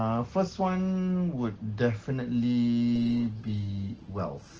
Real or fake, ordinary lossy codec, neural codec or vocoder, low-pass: real; Opus, 16 kbps; none; 7.2 kHz